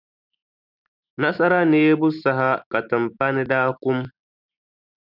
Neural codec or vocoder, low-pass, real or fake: none; 5.4 kHz; real